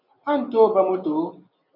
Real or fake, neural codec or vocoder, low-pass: real; none; 5.4 kHz